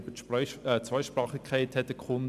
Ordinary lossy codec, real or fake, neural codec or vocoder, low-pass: none; real; none; 14.4 kHz